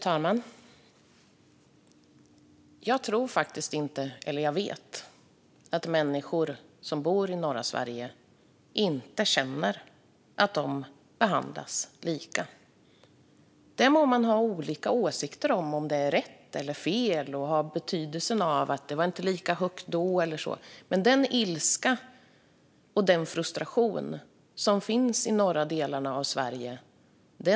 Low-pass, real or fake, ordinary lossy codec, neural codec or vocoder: none; real; none; none